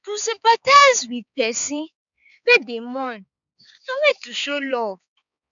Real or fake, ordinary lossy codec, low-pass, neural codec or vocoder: fake; none; 7.2 kHz; codec, 16 kHz, 4 kbps, X-Codec, HuBERT features, trained on balanced general audio